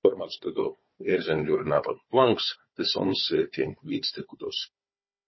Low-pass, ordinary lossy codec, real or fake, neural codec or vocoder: 7.2 kHz; MP3, 24 kbps; fake; codec, 16 kHz, 4 kbps, FunCodec, trained on Chinese and English, 50 frames a second